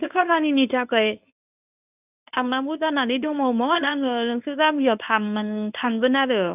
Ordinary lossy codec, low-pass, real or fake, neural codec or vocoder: none; 3.6 kHz; fake; codec, 24 kHz, 0.9 kbps, WavTokenizer, medium speech release version 1